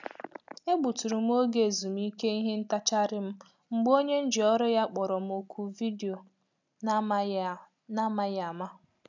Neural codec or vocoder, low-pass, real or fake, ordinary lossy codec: none; 7.2 kHz; real; none